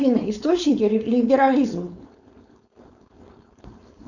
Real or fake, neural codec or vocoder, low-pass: fake; codec, 16 kHz, 4.8 kbps, FACodec; 7.2 kHz